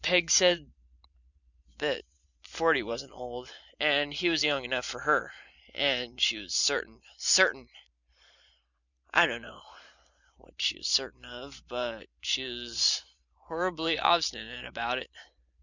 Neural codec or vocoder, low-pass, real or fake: vocoder, 44.1 kHz, 128 mel bands every 512 samples, BigVGAN v2; 7.2 kHz; fake